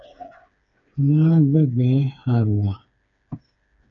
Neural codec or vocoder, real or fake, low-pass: codec, 16 kHz, 4 kbps, FreqCodec, smaller model; fake; 7.2 kHz